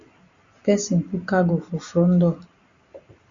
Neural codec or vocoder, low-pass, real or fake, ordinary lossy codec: none; 7.2 kHz; real; Opus, 64 kbps